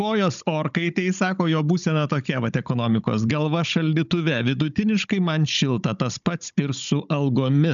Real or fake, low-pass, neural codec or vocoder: fake; 7.2 kHz; codec, 16 kHz, 16 kbps, FunCodec, trained on Chinese and English, 50 frames a second